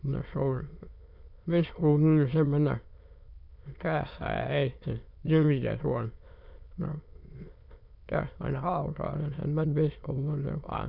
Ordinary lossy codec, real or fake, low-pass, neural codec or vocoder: none; fake; 5.4 kHz; autoencoder, 22.05 kHz, a latent of 192 numbers a frame, VITS, trained on many speakers